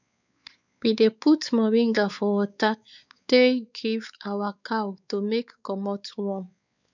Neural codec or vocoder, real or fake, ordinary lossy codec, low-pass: codec, 16 kHz, 4 kbps, X-Codec, WavLM features, trained on Multilingual LibriSpeech; fake; none; 7.2 kHz